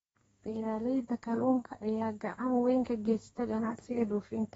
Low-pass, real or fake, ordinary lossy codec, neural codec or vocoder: 14.4 kHz; fake; AAC, 24 kbps; codec, 32 kHz, 1.9 kbps, SNAC